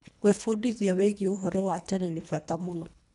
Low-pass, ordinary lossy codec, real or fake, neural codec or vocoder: 10.8 kHz; none; fake; codec, 24 kHz, 1.5 kbps, HILCodec